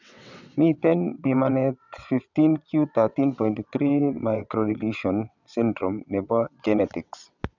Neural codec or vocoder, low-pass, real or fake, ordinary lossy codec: vocoder, 22.05 kHz, 80 mel bands, WaveNeXt; 7.2 kHz; fake; none